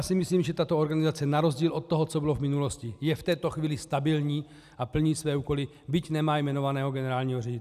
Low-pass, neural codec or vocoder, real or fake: 14.4 kHz; none; real